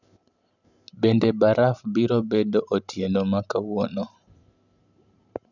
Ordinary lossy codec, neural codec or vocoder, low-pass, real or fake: none; vocoder, 44.1 kHz, 128 mel bands every 512 samples, BigVGAN v2; 7.2 kHz; fake